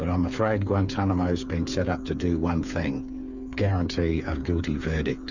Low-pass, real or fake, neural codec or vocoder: 7.2 kHz; fake; codec, 16 kHz, 8 kbps, FreqCodec, smaller model